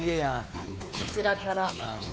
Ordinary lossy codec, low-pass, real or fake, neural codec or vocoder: none; none; fake; codec, 16 kHz, 4 kbps, X-Codec, WavLM features, trained on Multilingual LibriSpeech